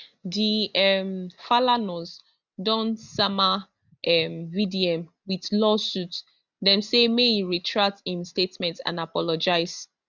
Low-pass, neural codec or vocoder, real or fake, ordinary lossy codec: 7.2 kHz; none; real; none